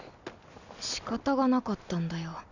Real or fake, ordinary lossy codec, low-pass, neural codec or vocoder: real; none; 7.2 kHz; none